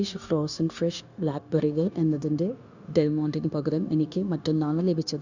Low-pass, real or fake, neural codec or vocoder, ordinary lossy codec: 7.2 kHz; fake; codec, 16 kHz, 0.9 kbps, LongCat-Audio-Codec; Opus, 64 kbps